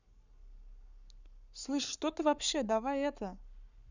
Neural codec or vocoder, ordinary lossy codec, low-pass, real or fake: codec, 44.1 kHz, 7.8 kbps, Pupu-Codec; none; 7.2 kHz; fake